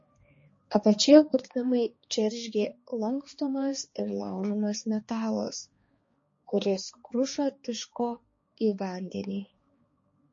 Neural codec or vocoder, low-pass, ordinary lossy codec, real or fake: codec, 16 kHz, 2 kbps, X-Codec, HuBERT features, trained on balanced general audio; 7.2 kHz; MP3, 32 kbps; fake